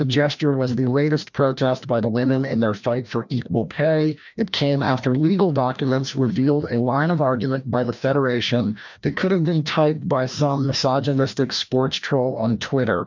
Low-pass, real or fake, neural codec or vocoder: 7.2 kHz; fake; codec, 16 kHz, 1 kbps, FreqCodec, larger model